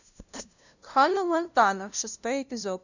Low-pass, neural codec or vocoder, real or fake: 7.2 kHz; codec, 16 kHz, 0.5 kbps, FunCodec, trained on LibriTTS, 25 frames a second; fake